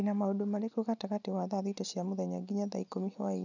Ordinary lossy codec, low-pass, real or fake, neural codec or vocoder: none; 7.2 kHz; real; none